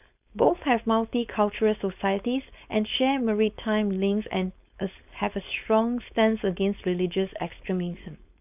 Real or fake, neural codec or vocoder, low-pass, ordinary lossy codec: fake; codec, 16 kHz, 4.8 kbps, FACodec; 3.6 kHz; none